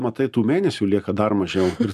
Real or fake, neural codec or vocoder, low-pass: real; none; 14.4 kHz